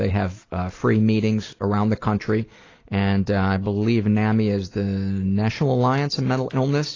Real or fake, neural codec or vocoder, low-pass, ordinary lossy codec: real; none; 7.2 kHz; AAC, 32 kbps